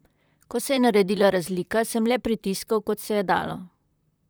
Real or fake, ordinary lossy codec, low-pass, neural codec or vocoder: fake; none; none; vocoder, 44.1 kHz, 128 mel bands, Pupu-Vocoder